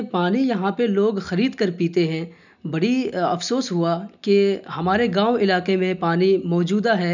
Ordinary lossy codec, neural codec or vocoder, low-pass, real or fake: none; none; 7.2 kHz; real